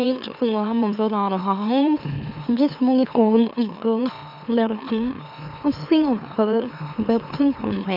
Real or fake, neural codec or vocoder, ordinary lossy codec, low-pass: fake; autoencoder, 44.1 kHz, a latent of 192 numbers a frame, MeloTTS; none; 5.4 kHz